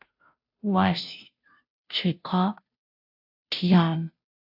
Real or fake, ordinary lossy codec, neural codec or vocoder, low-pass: fake; AAC, 48 kbps; codec, 16 kHz, 0.5 kbps, FunCodec, trained on Chinese and English, 25 frames a second; 5.4 kHz